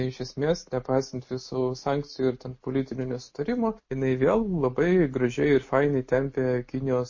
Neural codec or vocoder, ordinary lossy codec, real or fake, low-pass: none; MP3, 32 kbps; real; 7.2 kHz